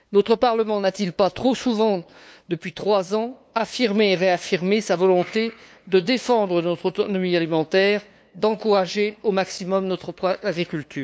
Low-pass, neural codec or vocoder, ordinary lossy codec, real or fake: none; codec, 16 kHz, 2 kbps, FunCodec, trained on LibriTTS, 25 frames a second; none; fake